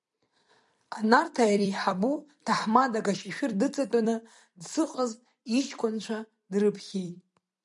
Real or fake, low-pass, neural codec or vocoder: fake; 10.8 kHz; vocoder, 44.1 kHz, 128 mel bands every 256 samples, BigVGAN v2